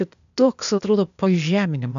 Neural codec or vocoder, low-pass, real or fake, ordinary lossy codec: codec, 16 kHz, 0.8 kbps, ZipCodec; 7.2 kHz; fake; AAC, 96 kbps